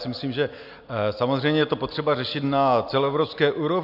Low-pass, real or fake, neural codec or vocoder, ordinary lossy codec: 5.4 kHz; real; none; AAC, 48 kbps